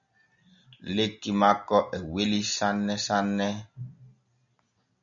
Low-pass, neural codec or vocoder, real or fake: 7.2 kHz; none; real